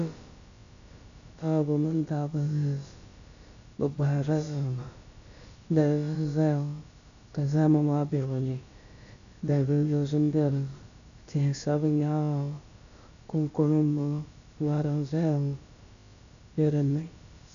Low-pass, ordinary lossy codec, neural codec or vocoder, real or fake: 7.2 kHz; MP3, 96 kbps; codec, 16 kHz, about 1 kbps, DyCAST, with the encoder's durations; fake